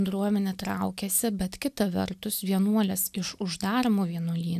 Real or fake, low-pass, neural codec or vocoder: real; 14.4 kHz; none